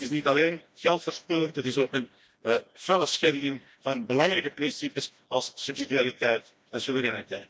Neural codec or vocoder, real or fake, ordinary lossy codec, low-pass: codec, 16 kHz, 1 kbps, FreqCodec, smaller model; fake; none; none